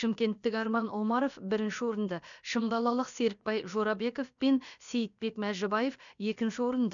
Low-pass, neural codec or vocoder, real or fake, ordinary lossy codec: 7.2 kHz; codec, 16 kHz, about 1 kbps, DyCAST, with the encoder's durations; fake; none